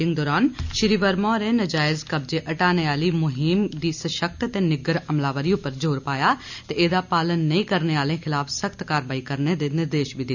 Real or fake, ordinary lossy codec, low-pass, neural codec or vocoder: real; none; 7.2 kHz; none